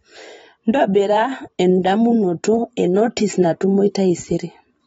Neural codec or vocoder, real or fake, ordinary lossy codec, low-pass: vocoder, 44.1 kHz, 128 mel bands every 512 samples, BigVGAN v2; fake; AAC, 24 kbps; 19.8 kHz